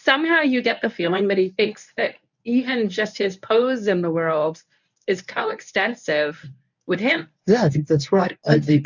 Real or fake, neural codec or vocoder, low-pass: fake; codec, 24 kHz, 0.9 kbps, WavTokenizer, medium speech release version 1; 7.2 kHz